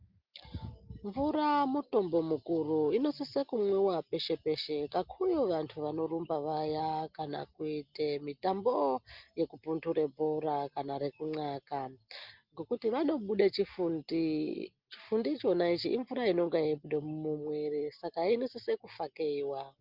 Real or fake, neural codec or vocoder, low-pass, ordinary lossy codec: real; none; 5.4 kHz; Opus, 32 kbps